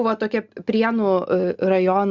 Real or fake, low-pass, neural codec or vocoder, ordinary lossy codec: real; 7.2 kHz; none; Opus, 64 kbps